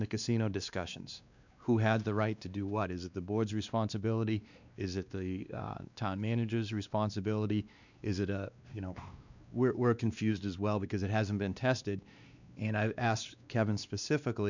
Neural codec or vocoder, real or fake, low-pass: codec, 16 kHz, 2 kbps, X-Codec, WavLM features, trained on Multilingual LibriSpeech; fake; 7.2 kHz